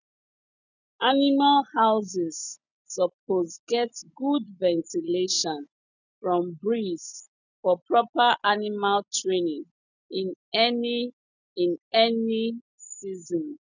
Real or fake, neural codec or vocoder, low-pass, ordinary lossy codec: real; none; 7.2 kHz; none